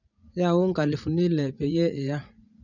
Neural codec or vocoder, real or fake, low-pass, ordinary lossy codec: vocoder, 44.1 kHz, 80 mel bands, Vocos; fake; 7.2 kHz; none